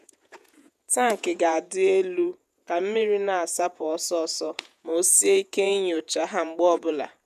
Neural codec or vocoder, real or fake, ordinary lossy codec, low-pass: vocoder, 48 kHz, 128 mel bands, Vocos; fake; none; 14.4 kHz